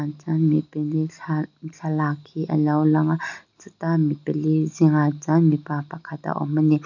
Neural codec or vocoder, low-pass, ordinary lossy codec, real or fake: none; 7.2 kHz; none; real